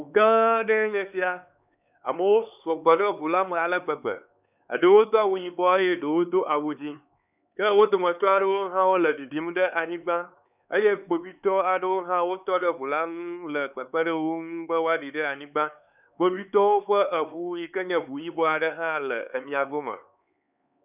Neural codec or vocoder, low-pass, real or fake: codec, 16 kHz, 4 kbps, X-Codec, HuBERT features, trained on LibriSpeech; 3.6 kHz; fake